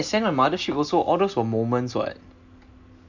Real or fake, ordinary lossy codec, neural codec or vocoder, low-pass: real; none; none; 7.2 kHz